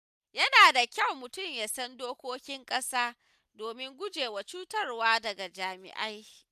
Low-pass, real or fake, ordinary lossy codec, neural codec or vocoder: 14.4 kHz; real; none; none